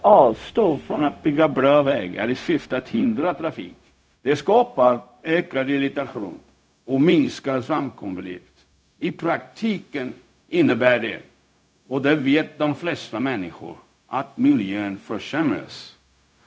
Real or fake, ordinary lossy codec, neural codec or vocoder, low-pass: fake; none; codec, 16 kHz, 0.4 kbps, LongCat-Audio-Codec; none